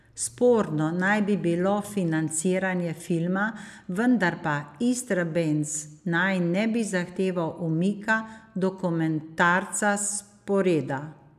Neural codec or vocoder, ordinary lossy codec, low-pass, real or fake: none; none; 14.4 kHz; real